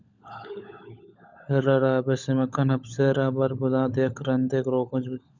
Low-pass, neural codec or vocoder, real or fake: 7.2 kHz; codec, 16 kHz, 16 kbps, FunCodec, trained on LibriTTS, 50 frames a second; fake